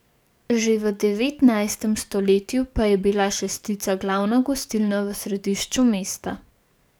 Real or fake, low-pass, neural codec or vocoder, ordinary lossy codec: fake; none; codec, 44.1 kHz, 7.8 kbps, DAC; none